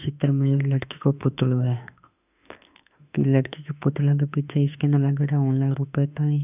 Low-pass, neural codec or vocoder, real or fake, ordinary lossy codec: 3.6 kHz; autoencoder, 48 kHz, 32 numbers a frame, DAC-VAE, trained on Japanese speech; fake; none